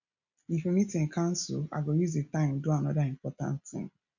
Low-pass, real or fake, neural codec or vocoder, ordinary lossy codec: 7.2 kHz; real; none; none